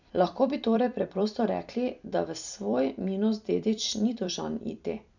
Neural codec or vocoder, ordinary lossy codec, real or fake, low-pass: vocoder, 44.1 kHz, 128 mel bands every 256 samples, BigVGAN v2; none; fake; 7.2 kHz